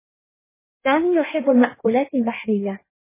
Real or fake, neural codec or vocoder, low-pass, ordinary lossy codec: fake; codec, 16 kHz in and 24 kHz out, 1.1 kbps, FireRedTTS-2 codec; 3.6 kHz; MP3, 16 kbps